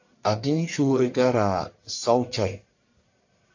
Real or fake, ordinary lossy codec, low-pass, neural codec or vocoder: fake; AAC, 48 kbps; 7.2 kHz; codec, 44.1 kHz, 1.7 kbps, Pupu-Codec